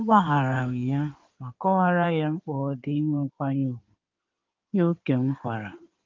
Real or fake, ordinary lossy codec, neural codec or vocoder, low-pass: fake; Opus, 24 kbps; codec, 16 kHz in and 24 kHz out, 2.2 kbps, FireRedTTS-2 codec; 7.2 kHz